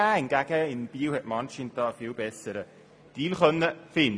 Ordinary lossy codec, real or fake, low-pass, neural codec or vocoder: none; real; none; none